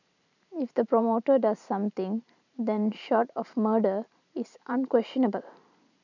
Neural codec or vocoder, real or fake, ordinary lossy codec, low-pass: vocoder, 44.1 kHz, 128 mel bands every 512 samples, BigVGAN v2; fake; none; 7.2 kHz